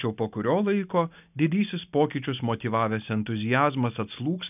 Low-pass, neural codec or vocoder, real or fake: 3.6 kHz; none; real